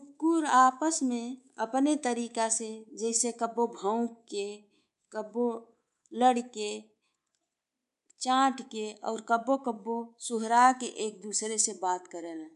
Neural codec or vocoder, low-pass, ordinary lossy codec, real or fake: codec, 24 kHz, 3.1 kbps, DualCodec; 10.8 kHz; none; fake